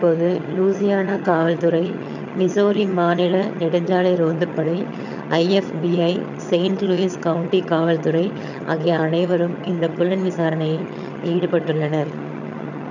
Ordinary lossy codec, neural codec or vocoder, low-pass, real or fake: none; vocoder, 22.05 kHz, 80 mel bands, HiFi-GAN; 7.2 kHz; fake